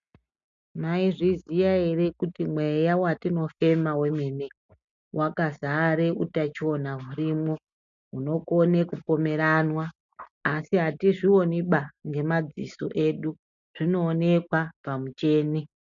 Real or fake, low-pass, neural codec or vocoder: real; 7.2 kHz; none